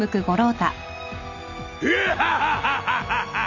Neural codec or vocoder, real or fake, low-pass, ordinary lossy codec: none; real; 7.2 kHz; none